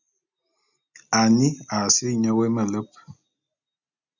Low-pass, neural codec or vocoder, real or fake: 7.2 kHz; none; real